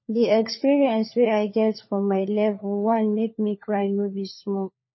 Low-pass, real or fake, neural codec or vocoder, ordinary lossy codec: 7.2 kHz; fake; codec, 16 kHz, 4 kbps, FunCodec, trained on LibriTTS, 50 frames a second; MP3, 24 kbps